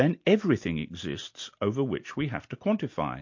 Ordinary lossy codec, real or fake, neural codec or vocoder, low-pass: MP3, 48 kbps; real; none; 7.2 kHz